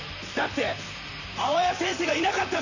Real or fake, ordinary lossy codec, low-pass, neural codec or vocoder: fake; none; 7.2 kHz; vocoder, 44.1 kHz, 128 mel bands, Pupu-Vocoder